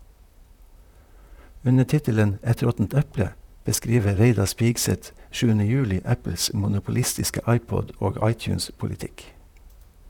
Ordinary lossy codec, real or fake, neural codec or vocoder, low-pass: none; fake; vocoder, 44.1 kHz, 128 mel bands, Pupu-Vocoder; 19.8 kHz